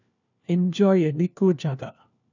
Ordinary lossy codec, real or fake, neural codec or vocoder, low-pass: none; fake; codec, 16 kHz, 1 kbps, FunCodec, trained on LibriTTS, 50 frames a second; 7.2 kHz